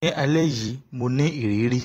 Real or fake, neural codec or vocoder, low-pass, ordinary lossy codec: fake; vocoder, 44.1 kHz, 128 mel bands every 256 samples, BigVGAN v2; 19.8 kHz; AAC, 48 kbps